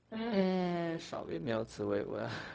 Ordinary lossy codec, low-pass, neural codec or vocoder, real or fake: none; none; codec, 16 kHz, 0.4 kbps, LongCat-Audio-Codec; fake